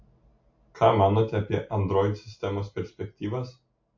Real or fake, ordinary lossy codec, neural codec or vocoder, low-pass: real; MP3, 48 kbps; none; 7.2 kHz